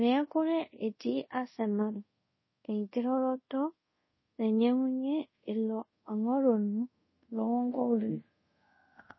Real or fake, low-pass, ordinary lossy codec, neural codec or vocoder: fake; 7.2 kHz; MP3, 24 kbps; codec, 24 kHz, 0.5 kbps, DualCodec